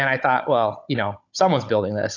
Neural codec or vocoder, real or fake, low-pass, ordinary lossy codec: codec, 16 kHz, 16 kbps, FunCodec, trained on Chinese and English, 50 frames a second; fake; 7.2 kHz; AAC, 48 kbps